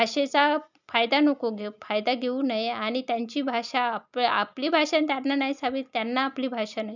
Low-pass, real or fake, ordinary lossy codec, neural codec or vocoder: 7.2 kHz; real; none; none